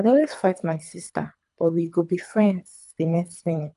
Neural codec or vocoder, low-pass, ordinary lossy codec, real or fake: codec, 24 kHz, 3 kbps, HILCodec; 10.8 kHz; none; fake